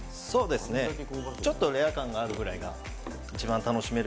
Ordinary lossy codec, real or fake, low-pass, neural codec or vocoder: none; real; none; none